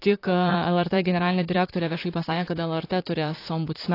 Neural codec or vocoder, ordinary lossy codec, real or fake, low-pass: vocoder, 44.1 kHz, 128 mel bands, Pupu-Vocoder; AAC, 32 kbps; fake; 5.4 kHz